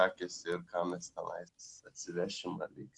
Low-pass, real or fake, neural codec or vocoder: 10.8 kHz; real; none